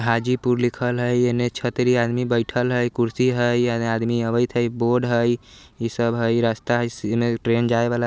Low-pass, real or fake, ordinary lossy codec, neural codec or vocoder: none; real; none; none